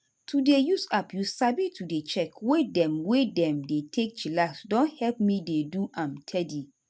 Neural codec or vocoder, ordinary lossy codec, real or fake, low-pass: none; none; real; none